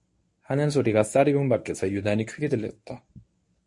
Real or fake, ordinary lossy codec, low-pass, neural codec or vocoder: fake; MP3, 48 kbps; 10.8 kHz; codec, 24 kHz, 0.9 kbps, WavTokenizer, medium speech release version 1